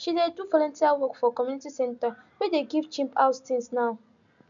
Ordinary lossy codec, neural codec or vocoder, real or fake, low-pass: none; none; real; 7.2 kHz